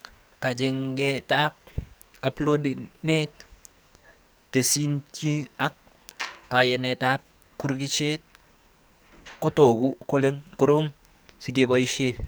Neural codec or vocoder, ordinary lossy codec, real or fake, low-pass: codec, 44.1 kHz, 2.6 kbps, SNAC; none; fake; none